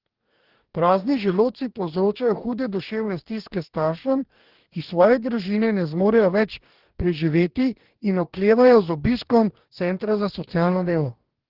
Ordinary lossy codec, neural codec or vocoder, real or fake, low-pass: Opus, 16 kbps; codec, 44.1 kHz, 2.6 kbps, DAC; fake; 5.4 kHz